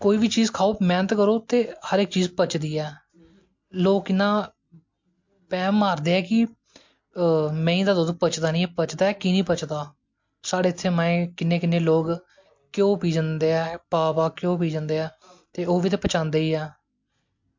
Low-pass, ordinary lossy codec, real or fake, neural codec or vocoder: 7.2 kHz; MP3, 48 kbps; real; none